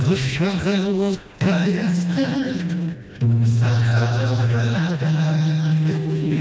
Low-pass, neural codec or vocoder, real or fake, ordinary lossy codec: none; codec, 16 kHz, 1 kbps, FreqCodec, smaller model; fake; none